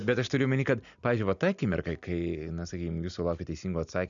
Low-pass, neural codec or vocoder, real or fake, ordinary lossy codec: 7.2 kHz; none; real; MP3, 96 kbps